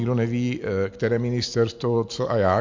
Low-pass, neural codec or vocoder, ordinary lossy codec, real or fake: 7.2 kHz; none; MP3, 48 kbps; real